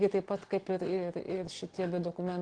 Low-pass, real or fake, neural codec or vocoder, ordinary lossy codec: 9.9 kHz; real; none; Opus, 16 kbps